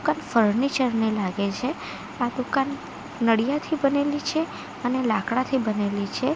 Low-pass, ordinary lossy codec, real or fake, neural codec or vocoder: none; none; real; none